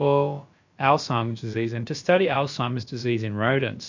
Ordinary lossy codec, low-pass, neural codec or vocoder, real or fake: MP3, 48 kbps; 7.2 kHz; codec, 16 kHz, about 1 kbps, DyCAST, with the encoder's durations; fake